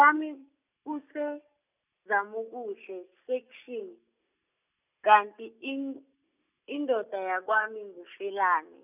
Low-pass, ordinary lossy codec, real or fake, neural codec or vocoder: 3.6 kHz; none; real; none